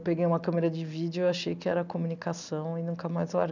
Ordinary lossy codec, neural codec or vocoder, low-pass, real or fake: none; none; 7.2 kHz; real